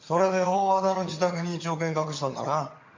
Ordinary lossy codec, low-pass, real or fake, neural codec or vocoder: MP3, 48 kbps; 7.2 kHz; fake; vocoder, 22.05 kHz, 80 mel bands, HiFi-GAN